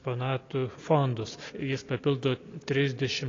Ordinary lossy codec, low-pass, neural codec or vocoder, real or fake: AAC, 32 kbps; 7.2 kHz; none; real